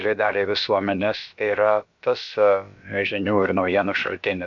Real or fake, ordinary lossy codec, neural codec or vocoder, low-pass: fake; MP3, 64 kbps; codec, 16 kHz, about 1 kbps, DyCAST, with the encoder's durations; 7.2 kHz